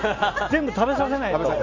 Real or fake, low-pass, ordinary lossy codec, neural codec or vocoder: real; 7.2 kHz; none; none